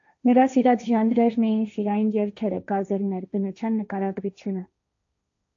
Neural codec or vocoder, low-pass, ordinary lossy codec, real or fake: codec, 16 kHz, 1.1 kbps, Voila-Tokenizer; 7.2 kHz; AAC, 64 kbps; fake